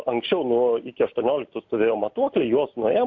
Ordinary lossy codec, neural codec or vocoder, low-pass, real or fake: AAC, 48 kbps; none; 7.2 kHz; real